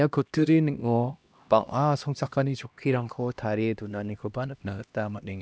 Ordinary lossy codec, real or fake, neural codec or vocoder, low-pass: none; fake; codec, 16 kHz, 1 kbps, X-Codec, HuBERT features, trained on LibriSpeech; none